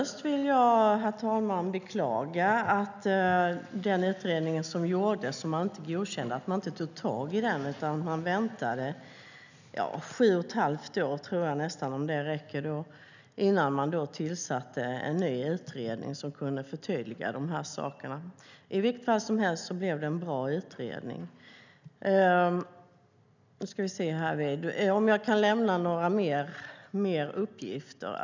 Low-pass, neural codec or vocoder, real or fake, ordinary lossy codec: 7.2 kHz; none; real; none